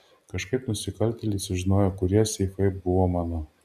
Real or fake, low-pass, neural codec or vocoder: real; 14.4 kHz; none